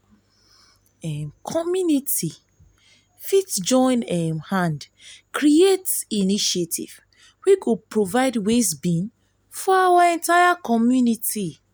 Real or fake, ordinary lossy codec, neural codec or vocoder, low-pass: real; none; none; none